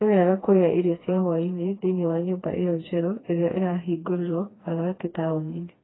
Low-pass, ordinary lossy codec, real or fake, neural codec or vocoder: 7.2 kHz; AAC, 16 kbps; fake; codec, 16 kHz, 2 kbps, FreqCodec, smaller model